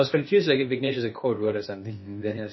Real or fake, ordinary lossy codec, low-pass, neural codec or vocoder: fake; MP3, 24 kbps; 7.2 kHz; codec, 16 kHz, 0.8 kbps, ZipCodec